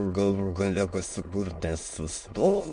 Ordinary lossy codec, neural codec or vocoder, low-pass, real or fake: MP3, 48 kbps; autoencoder, 22.05 kHz, a latent of 192 numbers a frame, VITS, trained on many speakers; 9.9 kHz; fake